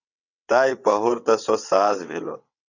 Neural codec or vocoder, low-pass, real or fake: vocoder, 22.05 kHz, 80 mel bands, WaveNeXt; 7.2 kHz; fake